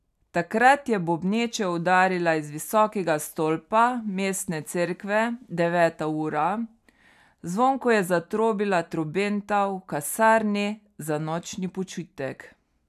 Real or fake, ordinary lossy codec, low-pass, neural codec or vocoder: real; none; 14.4 kHz; none